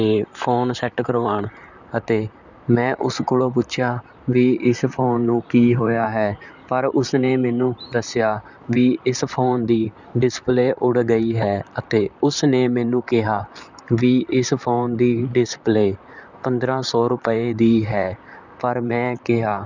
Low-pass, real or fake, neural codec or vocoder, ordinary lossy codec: 7.2 kHz; fake; vocoder, 44.1 kHz, 128 mel bands, Pupu-Vocoder; none